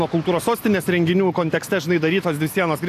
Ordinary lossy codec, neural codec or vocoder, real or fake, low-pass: AAC, 64 kbps; none; real; 14.4 kHz